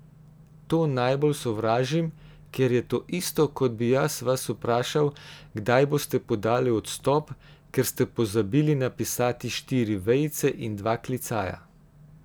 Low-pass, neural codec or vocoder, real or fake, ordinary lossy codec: none; none; real; none